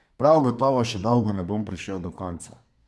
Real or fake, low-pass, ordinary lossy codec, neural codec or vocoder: fake; none; none; codec, 24 kHz, 1 kbps, SNAC